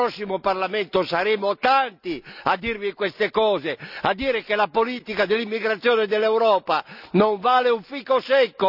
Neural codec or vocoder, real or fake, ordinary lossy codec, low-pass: none; real; none; 5.4 kHz